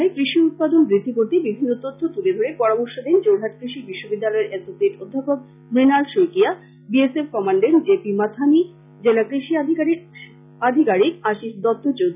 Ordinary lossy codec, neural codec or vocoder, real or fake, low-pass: none; none; real; 3.6 kHz